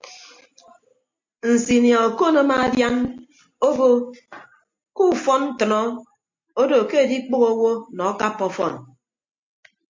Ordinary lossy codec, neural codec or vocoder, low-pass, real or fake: MP3, 48 kbps; none; 7.2 kHz; real